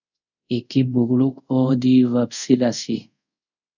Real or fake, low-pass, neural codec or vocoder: fake; 7.2 kHz; codec, 24 kHz, 0.5 kbps, DualCodec